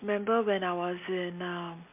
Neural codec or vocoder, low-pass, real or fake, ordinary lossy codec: none; 3.6 kHz; real; MP3, 32 kbps